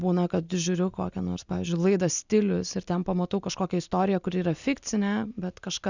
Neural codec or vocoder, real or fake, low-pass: none; real; 7.2 kHz